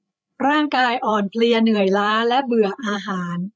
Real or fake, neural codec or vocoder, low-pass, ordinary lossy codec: fake; codec, 16 kHz, 8 kbps, FreqCodec, larger model; none; none